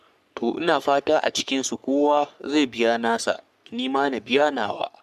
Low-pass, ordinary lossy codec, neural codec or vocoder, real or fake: 14.4 kHz; none; codec, 44.1 kHz, 3.4 kbps, Pupu-Codec; fake